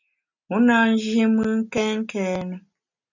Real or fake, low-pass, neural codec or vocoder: real; 7.2 kHz; none